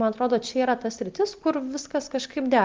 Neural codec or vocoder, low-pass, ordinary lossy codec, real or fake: none; 7.2 kHz; Opus, 32 kbps; real